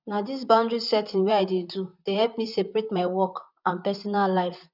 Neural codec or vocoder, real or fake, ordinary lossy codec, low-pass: vocoder, 44.1 kHz, 128 mel bands, Pupu-Vocoder; fake; none; 5.4 kHz